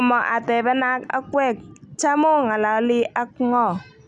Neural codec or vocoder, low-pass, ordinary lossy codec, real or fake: none; 10.8 kHz; none; real